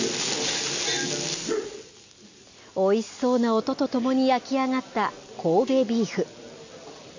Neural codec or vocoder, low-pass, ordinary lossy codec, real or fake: none; 7.2 kHz; AAC, 48 kbps; real